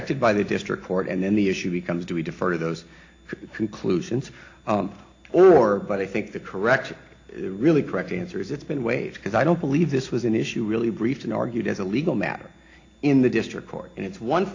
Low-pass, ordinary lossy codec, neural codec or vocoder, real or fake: 7.2 kHz; AAC, 48 kbps; none; real